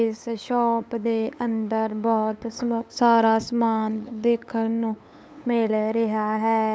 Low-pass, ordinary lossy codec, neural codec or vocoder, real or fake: none; none; codec, 16 kHz, 8 kbps, FunCodec, trained on LibriTTS, 25 frames a second; fake